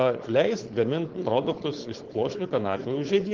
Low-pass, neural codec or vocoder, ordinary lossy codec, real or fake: 7.2 kHz; codec, 16 kHz, 4.8 kbps, FACodec; Opus, 16 kbps; fake